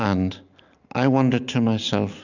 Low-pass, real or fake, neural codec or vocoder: 7.2 kHz; real; none